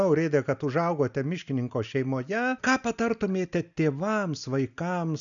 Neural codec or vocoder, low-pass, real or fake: none; 7.2 kHz; real